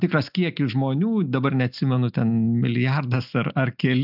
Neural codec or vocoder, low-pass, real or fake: none; 5.4 kHz; real